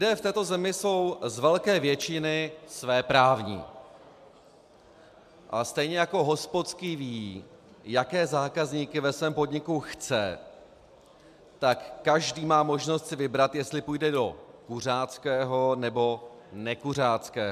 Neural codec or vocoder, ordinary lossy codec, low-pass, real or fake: none; MP3, 96 kbps; 14.4 kHz; real